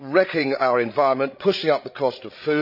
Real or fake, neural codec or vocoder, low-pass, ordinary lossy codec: fake; codec, 16 kHz, 16 kbps, FreqCodec, larger model; 5.4 kHz; none